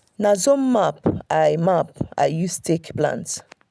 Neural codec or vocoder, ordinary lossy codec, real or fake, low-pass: none; none; real; none